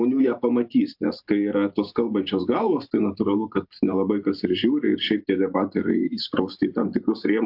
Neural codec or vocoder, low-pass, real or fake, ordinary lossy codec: vocoder, 44.1 kHz, 128 mel bands every 512 samples, BigVGAN v2; 5.4 kHz; fake; AAC, 48 kbps